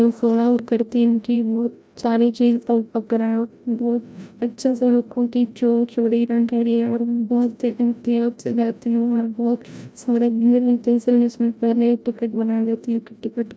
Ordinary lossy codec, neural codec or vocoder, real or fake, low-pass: none; codec, 16 kHz, 0.5 kbps, FreqCodec, larger model; fake; none